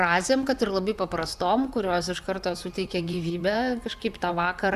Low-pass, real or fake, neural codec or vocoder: 14.4 kHz; fake; vocoder, 44.1 kHz, 128 mel bands, Pupu-Vocoder